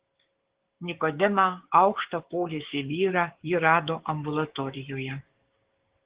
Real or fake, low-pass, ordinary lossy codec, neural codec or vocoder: fake; 3.6 kHz; Opus, 16 kbps; codec, 16 kHz, 6 kbps, DAC